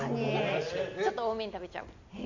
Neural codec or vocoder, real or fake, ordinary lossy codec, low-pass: none; real; Opus, 64 kbps; 7.2 kHz